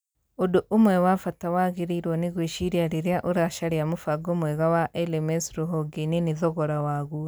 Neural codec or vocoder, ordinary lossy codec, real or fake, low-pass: none; none; real; none